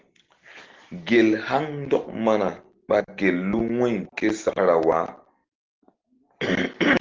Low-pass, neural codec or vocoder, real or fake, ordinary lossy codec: 7.2 kHz; none; real; Opus, 16 kbps